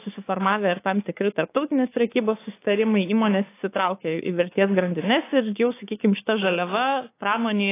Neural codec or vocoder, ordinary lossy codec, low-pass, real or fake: codec, 16 kHz, 6 kbps, DAC; AAC, 24 kbps; 3.6 kHz; fake